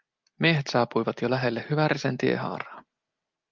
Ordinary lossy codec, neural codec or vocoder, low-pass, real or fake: Opus, 32 kbps; none; 7.2 kHz; real